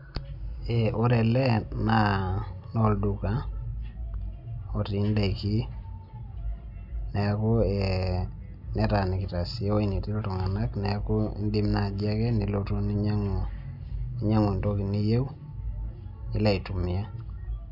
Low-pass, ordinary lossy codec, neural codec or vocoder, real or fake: 5.4 kHz; none; none; real